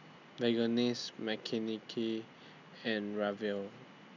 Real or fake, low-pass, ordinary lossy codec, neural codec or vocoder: real; 7.2 kHz; none; none